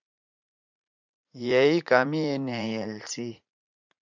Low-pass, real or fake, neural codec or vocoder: 7.2 kHz; fake; vocoder, 44.1 kHz, 80 mel bands, Vocos